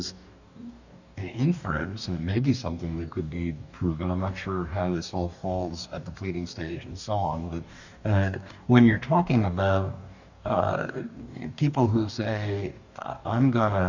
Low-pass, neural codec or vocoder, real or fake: 7.2 kHz; codec, 44.1 kHz, 2.6 kbps, DAC; fake